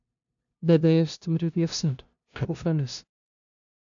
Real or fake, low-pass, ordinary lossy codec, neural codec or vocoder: fake; 7.2 kHz; AAC, 64 kbps; codec, 16 kHz, 0.5 kbps, FunCodec, trained on LibriTTS, 25 frames a second